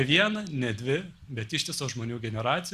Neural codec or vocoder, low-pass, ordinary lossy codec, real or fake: none; 14.4 kHz; Opus, 64 kbps; real